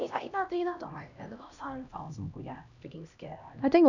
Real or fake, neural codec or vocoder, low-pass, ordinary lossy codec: fake; codec, 16 kHz, 1 kbps, X-Codec, HuBERT features, trained on LibriSpeech; 7.2 kHz; none